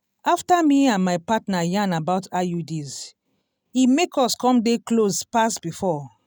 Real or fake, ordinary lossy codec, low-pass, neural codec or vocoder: real; none; none; none